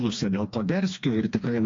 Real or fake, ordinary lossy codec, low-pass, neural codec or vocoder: fake; AAC, 48 kbps; 7.2 kHz; codec, 16 kHz, 2 kbps, FreqCodec, smaller model